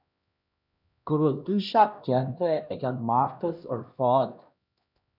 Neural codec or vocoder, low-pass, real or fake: codec, 16 kHz, 1 kbps, X-Codec, HuBERT features, trained on LibriSpeech; 5.4 kHz; fake